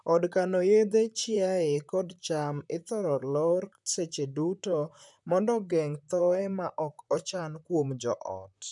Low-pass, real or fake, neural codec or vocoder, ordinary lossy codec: 10.8 kHz; fake; vocoder, 44.1 kHz, 128 mel bands, Pupu-Vocoder; none